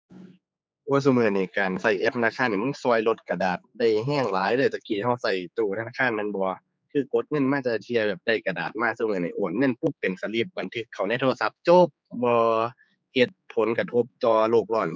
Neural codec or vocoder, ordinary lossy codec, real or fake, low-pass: codec, 16 kHz, 4 kbps, X-Codec, HuBERT features, trained on general audio; none; fake; none